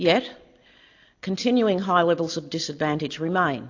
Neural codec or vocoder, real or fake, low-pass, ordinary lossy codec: none; real; 7.2 kHz; AAC, 48 kbps